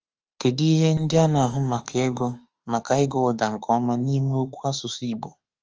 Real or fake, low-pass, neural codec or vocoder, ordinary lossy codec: fake; 7.2 kHz; autoencoder, 48 kHz, 32 numbers a frame, DAC-VAE, trained on Japanese speech; Opus, 24 kbps